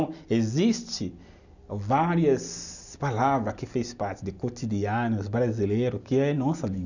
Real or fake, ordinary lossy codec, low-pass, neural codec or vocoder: real; none; 7.2 kHz; none